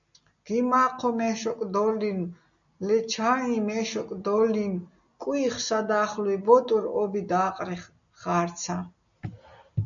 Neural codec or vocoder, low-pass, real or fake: none; 7.2 kHz; real